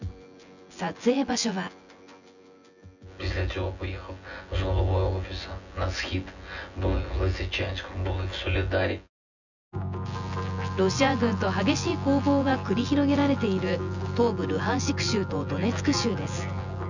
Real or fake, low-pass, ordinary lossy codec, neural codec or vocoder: fake; 7.2 kHz; none; vocoder, 24 kHz, 100 mel bands, Vocos